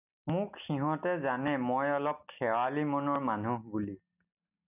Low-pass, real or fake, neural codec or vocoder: 3.6 kHz; real; none